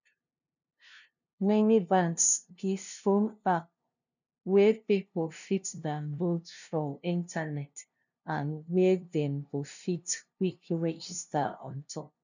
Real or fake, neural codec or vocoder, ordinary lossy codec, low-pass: fake; codec, 16 kHz, 0.5 kbps, FunCodec, trained on LibriTTS, 25 frames a second; none; 7.2 kHz